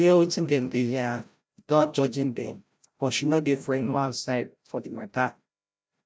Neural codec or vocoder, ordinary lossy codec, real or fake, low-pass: codec, 16 kHz, 0.5 kbps, FreqCodec, larger model; none; fake; none